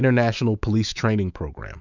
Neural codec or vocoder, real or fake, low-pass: autoencoder, 48 kHz, 128 numbers a frame, DAC-VAE, trained on Japanese speech; fake; 7.2 kHz